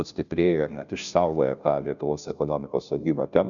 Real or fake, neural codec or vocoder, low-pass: fake; codec, 16 kHz, 0.5 kbps, FunCodec, trained on Chinese and English, 25 frames a second; 7.2 kHz